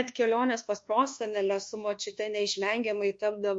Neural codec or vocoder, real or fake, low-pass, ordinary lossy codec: codec, 24 kHz, 1.2 kbps, DualCodec; fake; 10.8 kHz; MP3, 48 kbps